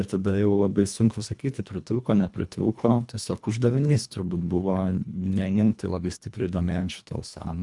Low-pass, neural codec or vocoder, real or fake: 10.8 kHz; codec, 24 kHz, 1.5 kbps, HILCodec; fake